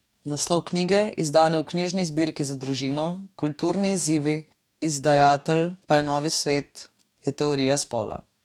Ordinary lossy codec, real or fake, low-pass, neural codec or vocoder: none; fake; 19.8 kHz; codec, 44.1 kHz, 2.6 kbps, DAC